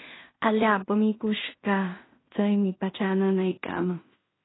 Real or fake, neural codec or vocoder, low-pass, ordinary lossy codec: fake; codec, 16 kHz in and 24 kHz out, 0.4 kbps, LongCat-Audio-Codec, two codebook decoder; 7.2 kHz; AAC, 16 kbps